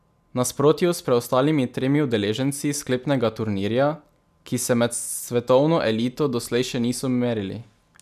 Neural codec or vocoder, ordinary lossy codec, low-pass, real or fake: none; none; 14.4 kHz; real